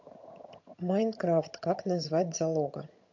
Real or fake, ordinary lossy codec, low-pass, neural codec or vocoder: fake; MP3, 48 kbps; 7.2 kHz; vocoder, 22.05 kHz, 80 mel bands, HiFi-GAN